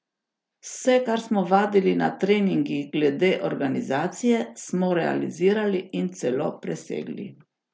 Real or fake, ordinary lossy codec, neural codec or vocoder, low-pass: real; none; none; none